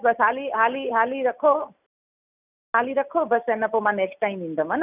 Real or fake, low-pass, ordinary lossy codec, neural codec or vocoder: real; 3.6 kHz; none; none